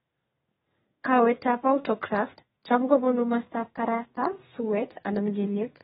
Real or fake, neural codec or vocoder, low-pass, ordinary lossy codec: fake; codec, 32 kHz, 1.9 kbps, SNAC; 14.4 kHz; AAC, 16 kbps